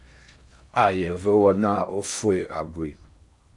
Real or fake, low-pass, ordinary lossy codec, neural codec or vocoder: fake; 10.8 kHz; AAC, 64 kbps; codec, 16 kHz in and 24 kHz out, 0.6 kbps, FocalCodec, streaming, 4096 codes